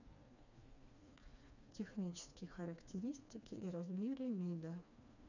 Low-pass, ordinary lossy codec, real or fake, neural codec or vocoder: 7.2 kHz; none; fake; codec, 16 kHz, 2 kbps, FreqCodec, smaller model